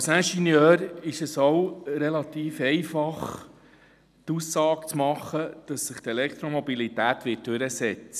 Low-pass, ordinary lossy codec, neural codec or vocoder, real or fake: 14.4 kHz; none; none; real